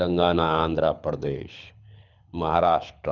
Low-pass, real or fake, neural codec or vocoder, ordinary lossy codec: 7.2 kHz; fake; codec, 24 kHz, 6 kbps, HILCodec; none